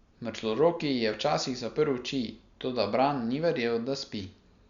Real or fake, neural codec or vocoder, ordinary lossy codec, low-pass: real; none; Opus, 64 kbps; 7.2 kHz